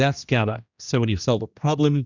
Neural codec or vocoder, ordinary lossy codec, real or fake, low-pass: codec, 16 kHz, 1 kbps, X-Codec, HuBERT features, trained on general audio; Opus, 64 kbps; fake; 7.2 kHz